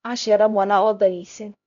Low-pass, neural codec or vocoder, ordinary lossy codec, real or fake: 7.2 kHz; codec, 16 kHz, 0.5 kbps, X-Codec, HuBERT features, trained on LibriSpeech; none; fake